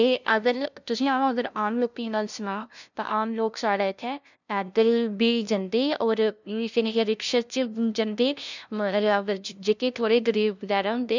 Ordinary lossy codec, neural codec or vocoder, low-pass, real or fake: none; codec, 16 kHz, 0.5 kbps, FunCodec, trained on LibriTTS, 25 frames a second; 7.2 kHz; fake